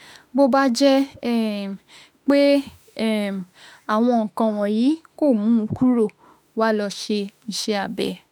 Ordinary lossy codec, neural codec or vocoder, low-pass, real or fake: none; autoencoder, 48 kHz, 32 numbers a frame, DAC-VAE, trained on Japanese speech; 19.8 kHz; fake